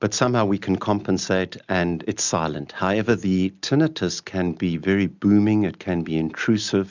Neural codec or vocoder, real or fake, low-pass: none; real; 7.2 kHz